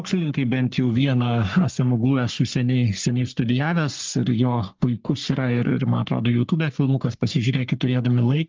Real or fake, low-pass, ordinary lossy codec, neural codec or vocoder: fake; 7.2 kHz; Opus, 32 kbps; codec, 44.1 kHz, 3.4 kbps, Pupu-Codec